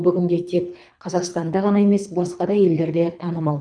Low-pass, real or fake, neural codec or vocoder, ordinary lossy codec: 9.9 kHz; fake; codec, 24 kHz, 3 kbps, HILCodec; none